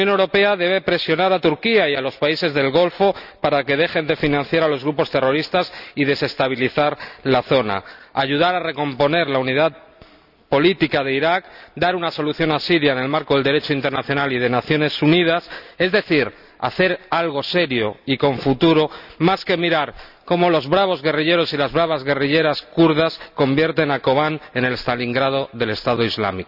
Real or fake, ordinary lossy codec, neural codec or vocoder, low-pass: real; none; none; 5.4 kHz